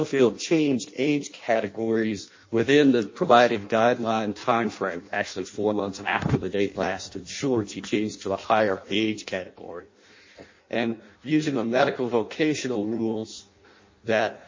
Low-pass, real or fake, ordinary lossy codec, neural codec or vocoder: 7.2 kHz; fake; MP3, 32 kbps; codec, 16 kHz in and 24 kHz out, 0.6 kbps, FireRedTTS-2 codec